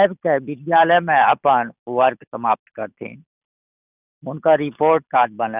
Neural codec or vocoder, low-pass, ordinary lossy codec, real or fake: none; 3.6 kHz; none; real